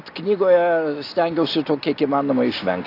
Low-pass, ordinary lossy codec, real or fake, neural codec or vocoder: 5.4 kHz; AAC, 32 kbps; real; none